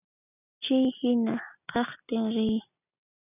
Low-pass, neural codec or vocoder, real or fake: 3.6 kHz; none; real